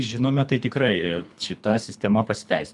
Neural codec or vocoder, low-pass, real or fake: codec, 24 kHz, 3 kbps, HILCodec; 10.8 kHz; fake